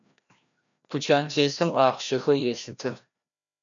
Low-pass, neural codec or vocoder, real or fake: 7.2 kHz; codec, 16 kHz, 1 kbps, FreqCodec, larger model; fake